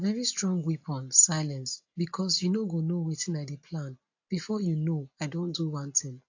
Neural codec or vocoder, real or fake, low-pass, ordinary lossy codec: none; real; 7.2 kHz; AAC, 48 kbps